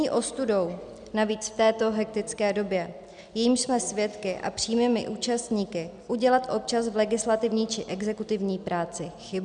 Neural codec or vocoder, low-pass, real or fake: none; 9.9 kHz; real